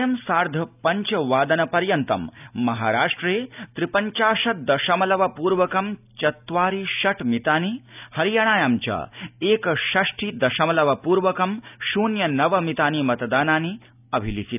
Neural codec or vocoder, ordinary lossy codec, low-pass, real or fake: none; none; 3.6 kHz; real